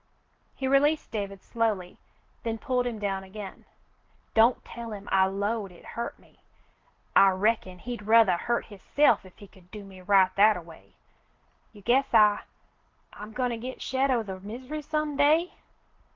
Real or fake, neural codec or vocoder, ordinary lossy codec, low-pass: real; none; Opus, 16 kbps; 7.2 kHz